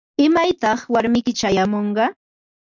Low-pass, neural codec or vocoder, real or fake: 7.2 kHz; none; real